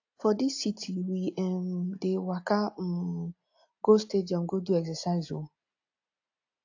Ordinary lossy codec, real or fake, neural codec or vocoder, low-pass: AAC, 48 kbps; real; none; 7.2 kHz